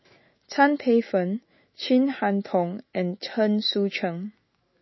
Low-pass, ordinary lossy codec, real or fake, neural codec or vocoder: 7.2 kHz; MP3, 24 kbps; fake; autoencoder, 48 kHz, 128 numbers a frame, DAC-VAE, trained on Japanese speech